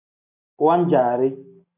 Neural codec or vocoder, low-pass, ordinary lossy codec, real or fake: none; 3.6 kHz; AAC, 32 kbps; real